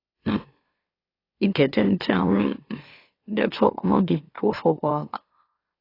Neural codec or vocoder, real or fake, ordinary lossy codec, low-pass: autoencoder, 44.1 kHz, a latent of 192 numbers a frame, MeloTTS; fake; AAC, 24 kbps; 5.4 kHz